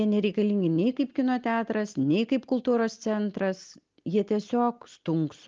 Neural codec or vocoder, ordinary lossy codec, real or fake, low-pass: none; Opus, 32 kbps; real; 7.2 kHz